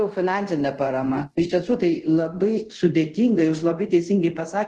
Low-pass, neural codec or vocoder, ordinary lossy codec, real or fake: 10.8 kHz; codec, 24 kHz, 0.5 kbps, DualCodec; Opus, 16 kbps; fake